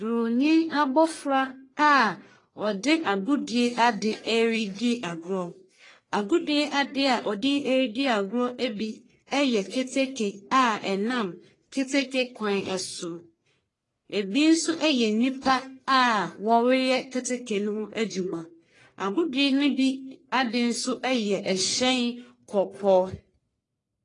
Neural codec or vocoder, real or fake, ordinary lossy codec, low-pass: codec, 44.1 kHz, 1.7 kbps, Pupu-Codec; fake; AAC, 32 kbps; 10.8 kHz